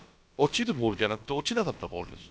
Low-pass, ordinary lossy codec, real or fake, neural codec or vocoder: none; none; fake; codec, 16 kHz, about 1 kbps, DyCAST, with the encoder's durations